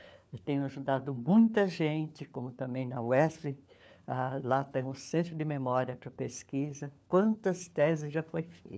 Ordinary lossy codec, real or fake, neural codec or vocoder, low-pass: none; fake; codec, 16 kHz, 4 kbps, FunCodec, trained on LibriTTS, 50 frames a second; none